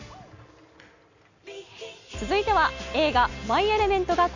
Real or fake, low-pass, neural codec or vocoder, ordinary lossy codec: real; 7.2 kHz; none; none